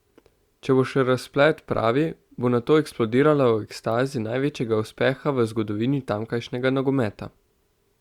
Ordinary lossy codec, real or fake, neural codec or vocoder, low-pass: Opus, 64 kbps; real; none; 19.8 kHz